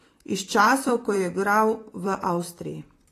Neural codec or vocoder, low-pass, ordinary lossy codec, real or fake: vocoder, 44.1 kHz, 128 mel bands, Pupu-Vocoder; 14.4 kHz; AAC, 48 kbps; fake